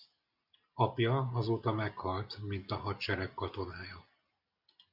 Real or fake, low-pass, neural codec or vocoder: real; 5.4 kHz; none